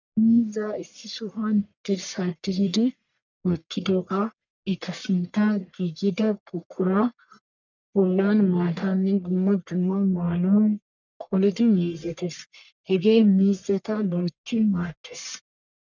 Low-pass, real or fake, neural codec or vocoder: 7.2 kHz; fake; codec, 44.1 kHz, 1.7 kbps, Pupu-Codec